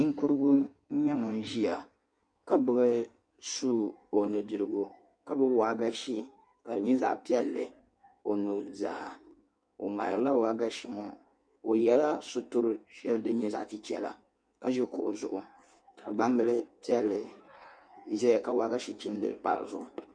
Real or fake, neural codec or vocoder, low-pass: fake; codec, 16 kHz in and 24 kHz out, 1.1 kbps, FireRedTTS-2 codec; 9.9 kHz